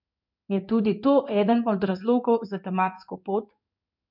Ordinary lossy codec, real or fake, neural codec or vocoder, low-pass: none; fake; codec, 16 kHz in and 24 kHz out, 1 kbps, XY-Tokenizer; 5.4 kHz